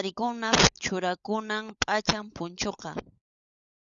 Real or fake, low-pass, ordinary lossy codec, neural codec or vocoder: fake; 7.2 kHz; Opus, 64 kbps; codec, 16 kHz, 16 kbps, FunCodec, trained on LibriTTS, 50 frames a second